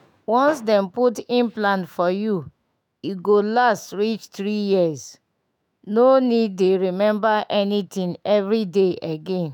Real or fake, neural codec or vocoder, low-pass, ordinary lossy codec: fake; autoencoder, 48 kHz, 128 numbers a frame, DAC-VAE, trained on Japanese speech; 19.8 kHz; none